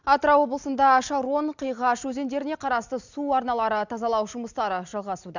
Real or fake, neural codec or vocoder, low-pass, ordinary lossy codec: real; none; 7.2 kHz; none